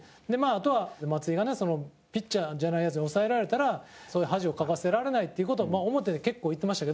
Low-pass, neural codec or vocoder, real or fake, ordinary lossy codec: none; none; real; none